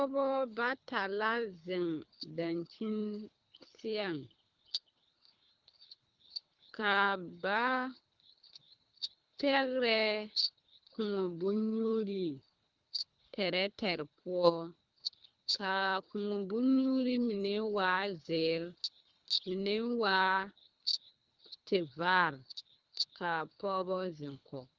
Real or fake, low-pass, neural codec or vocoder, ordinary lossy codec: fake; 7.2 kHz; codec, 24 kHz, 3 kbps, HILCodec; Opus, 32 kbps